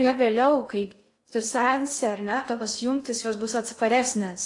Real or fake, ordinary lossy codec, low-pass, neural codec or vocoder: fake; AAC, 48 kbps; 10.8 kHz; codec, 16 kHz in and 24 kHz out, 0.6 kbps, FocalCodec, streaming, 2048 codes